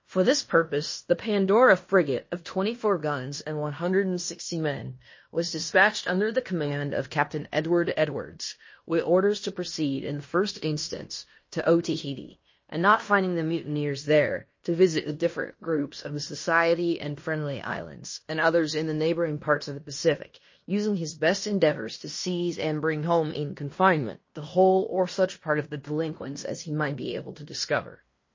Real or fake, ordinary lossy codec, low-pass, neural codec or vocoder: fake; MP3, 32 kbps; 7.2 kHz; codec, 16 kHz in and 24 kHz out, 0.9 kbps, LongCat-Audio-Codec, fine tuned four codebook decoder